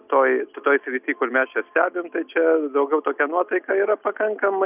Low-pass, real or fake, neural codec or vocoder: 3.6 kHz; real; none